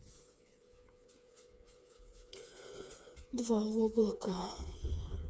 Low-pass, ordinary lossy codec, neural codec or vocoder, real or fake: none; none; codec, 16 kHz, 4 kbps, FreqCodec, smaller model; fake